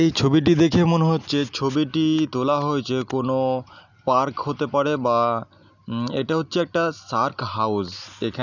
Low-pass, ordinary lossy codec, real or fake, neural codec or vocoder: 7.2 kHz; none; real; none